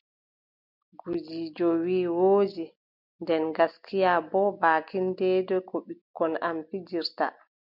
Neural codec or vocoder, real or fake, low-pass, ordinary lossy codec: none; real; 5.4 kHz; MP3, 48 kbps